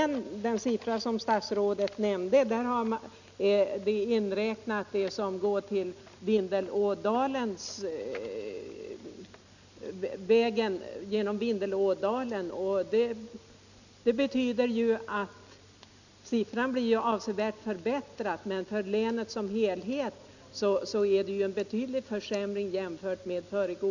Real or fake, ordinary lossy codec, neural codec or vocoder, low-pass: real; none; none; 7.2 kHz